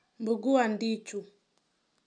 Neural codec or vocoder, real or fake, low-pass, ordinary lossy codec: none; real; 9.9 kHz; none